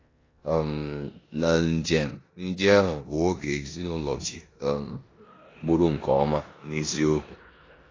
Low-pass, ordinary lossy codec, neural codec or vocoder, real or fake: 7.2 kHz; AAC, 32 kbps; codec, 16 kHz in and 24 kHz out, 0.9 kbps, LongCat-Audio-Codec, four codebook decoder; fake